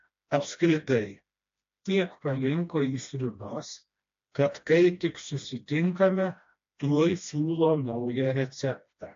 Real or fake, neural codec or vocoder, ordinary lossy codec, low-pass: fake; codec, 16 kHz, 1 kbps, FreqCodec, smaller model; AAC, 48 kbps; 7.2 kHz